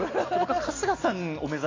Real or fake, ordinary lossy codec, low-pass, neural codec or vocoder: real; AAC, 32 kbps; 7.2 kHz; none